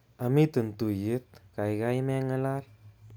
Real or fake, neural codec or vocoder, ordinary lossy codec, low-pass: real; none; none; none